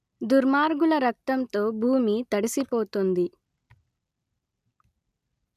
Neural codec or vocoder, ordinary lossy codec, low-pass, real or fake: none; none; 14.4 kHz; real